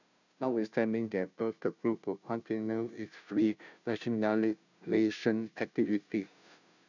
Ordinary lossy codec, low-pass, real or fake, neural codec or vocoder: none; 7.2 kHz; fake; codec, 16 kHz, 0.5 kbps, FunCodec, trained on Chinese and English, 25 frames a second